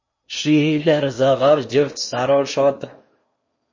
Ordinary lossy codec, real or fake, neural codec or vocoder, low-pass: MP3, 32 kbps; fake; codec, 16 kHz in and 24 kHz out, 0.8 kbps, FocalCodec, streaming, 65536 codes; 7.2 kHz